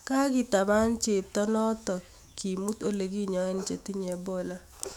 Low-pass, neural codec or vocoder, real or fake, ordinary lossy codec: 19.8 kHz; autoencoder, 48 kHz, 128 numbers a frame, DAC-VAE, trained on Japanese speech; fake; none